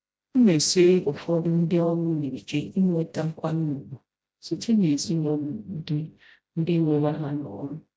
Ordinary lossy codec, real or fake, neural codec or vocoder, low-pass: none; fake; codec, 16 kHz, 0.5 kbps, FreqCodec, smaller model; none